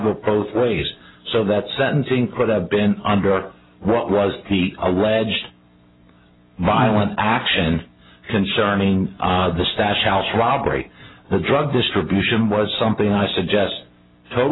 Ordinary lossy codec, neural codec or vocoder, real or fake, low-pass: AAC, 16 kbps; none; real; 7.2 kHz